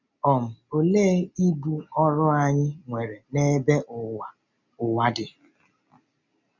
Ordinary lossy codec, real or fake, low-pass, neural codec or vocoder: none; real; 7.2 kHz; none